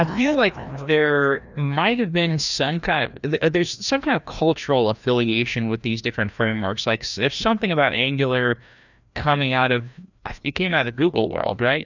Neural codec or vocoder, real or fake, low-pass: codec, 16 kHz, 1 kbps, FreqCodec, larger model; fake; 7.2 kHz